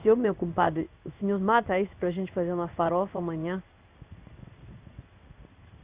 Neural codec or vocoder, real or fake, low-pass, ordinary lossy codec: codec, 16 kHz in and 24 kHz out, 1 kbps, XY-Tokenizer; fake; 3.6 kHz; Opus, 64 kbps